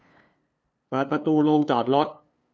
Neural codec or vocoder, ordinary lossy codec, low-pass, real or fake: codec, 16 kHz, 2 kbps, FunCodec, trained on LibriTTS, 25 frames a second; none; none; fake